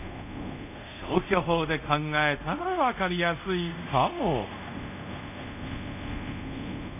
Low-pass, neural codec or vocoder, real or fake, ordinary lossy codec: 3.6 kHz; codec, 24 kHz, 0.5 kbps, DualCodec; fake; none